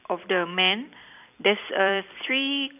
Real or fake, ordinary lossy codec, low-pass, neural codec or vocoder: fake; none; 3.6 kHz; vocoder, 44.1 kHz, 128 mel bands every 256 samples, BigVGAN v2